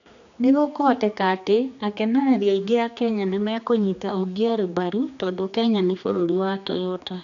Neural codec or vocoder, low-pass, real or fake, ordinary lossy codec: codec, 16 kHz, 2 kbps, X-Codec, HuBERT features, trained on general audio; 7.2 kHz; fake; none